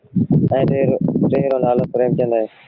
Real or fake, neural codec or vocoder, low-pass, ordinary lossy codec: real; none; 5.4 kHz; Opus, 32 kbps